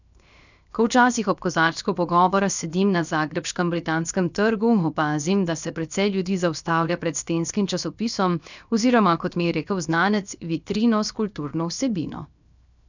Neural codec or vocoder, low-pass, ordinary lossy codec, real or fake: codec, 16 kHz, 0.7 kbps, FocalCodec; 7.2 kHz; none; fake